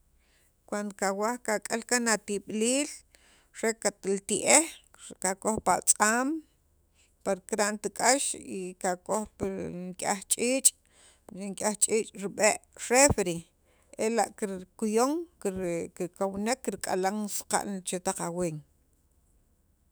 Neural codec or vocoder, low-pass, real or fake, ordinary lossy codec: autoencoder, 48 kHz, 128 numbers a frame, DAC-VAE, trained on Japanese speech; none; fake; none